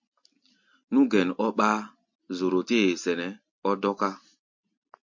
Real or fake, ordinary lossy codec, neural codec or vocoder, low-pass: real; MP3, 48 kbps; none; 7.2 kHz